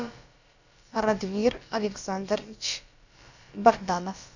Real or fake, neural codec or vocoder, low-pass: fake; codec, 16 kHz, about 1 kbps, DyCAST, with the encoder's durations; 7.2 kHz